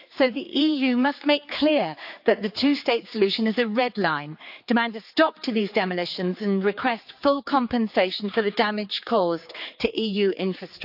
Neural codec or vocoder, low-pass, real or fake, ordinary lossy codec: codec, 16 kHz, 4 kbps, X-Codec, HuBERT features, trained on general audio; 5.4 kHz; fake; AAC, 48 kbps